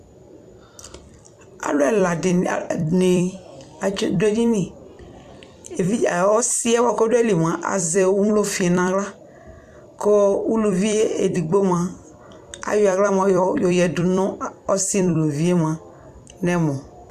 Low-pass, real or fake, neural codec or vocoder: 14.4 kHz; fake; vocoder, 44.1 kHz, 128 mel bands every 256 samples, BigVGAN v2